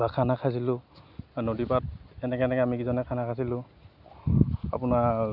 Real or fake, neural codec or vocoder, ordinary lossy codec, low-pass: real; none; none; 5.4 kHz